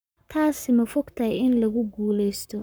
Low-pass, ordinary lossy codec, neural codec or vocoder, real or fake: none; none; codec, 44.1 kHz, 7.8 kbps, Pupu-Codec; fake